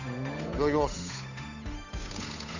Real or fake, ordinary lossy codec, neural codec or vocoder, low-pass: fake; none; codec, 16 kHz, 8 kbps, FunCodec, trained on Chinese and English, 25 frames a second; 7.2 kHz